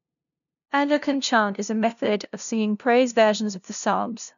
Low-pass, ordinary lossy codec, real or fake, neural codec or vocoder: 7.2 kHz; none; fake; codec, 16 kHz, 0.5 kbps, FunCodec, trained on LibriTTS, 25 frames a second